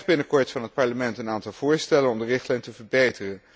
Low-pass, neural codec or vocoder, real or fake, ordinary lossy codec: none; none; real; none